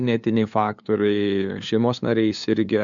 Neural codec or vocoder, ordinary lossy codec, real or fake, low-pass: codec, 16 kHz, 2 kbps, FunCodec, trained on LibriTTS, 25 frames a second; MP3, 64 kbps; fake; 7.2 kHz